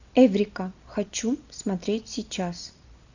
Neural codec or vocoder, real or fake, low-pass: none; real; 7.2 kHz